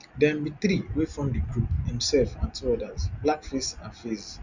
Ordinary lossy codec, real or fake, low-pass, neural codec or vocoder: Opus, 64 kbps; real; 7.2 kHz; none